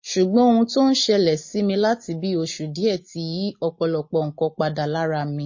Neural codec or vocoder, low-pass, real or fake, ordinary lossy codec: none; 7.2 kHz; real; MP3, 32 kbps